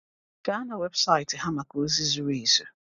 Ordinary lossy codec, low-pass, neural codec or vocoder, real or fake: none; 7.2 kHz; none; real